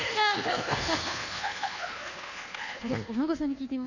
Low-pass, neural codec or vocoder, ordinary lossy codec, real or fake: 7.2 kHz; codec, 24 kHz, 1.2 kbps, DualCodec; none; fake